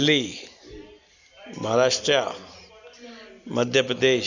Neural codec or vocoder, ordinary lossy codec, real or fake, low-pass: none; none; real; 7.2 kHz